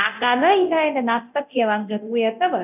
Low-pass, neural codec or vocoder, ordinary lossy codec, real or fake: 3.6 kHz; codec, 24 kHz, 0.9 kbps, DualCodec; none; fake